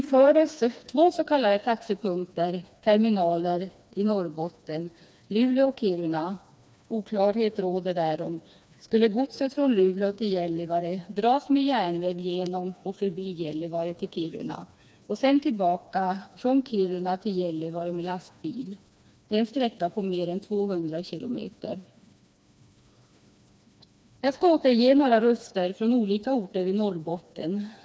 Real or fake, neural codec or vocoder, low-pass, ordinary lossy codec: fake; codec, 16 kHz, 2 kbps, FreqCodec, smaller model; none; none